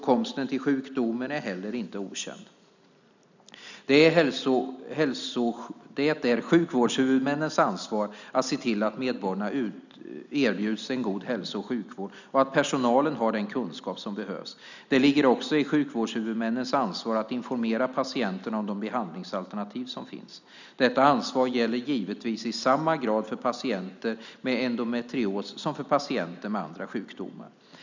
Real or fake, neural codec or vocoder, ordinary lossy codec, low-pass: real; none; none; 7.2 kHz